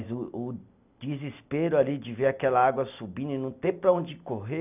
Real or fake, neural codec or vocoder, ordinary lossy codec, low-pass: real; none; none; 3.6 kHz